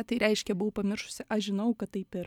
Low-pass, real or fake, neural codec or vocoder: 19.8 kHz; real; none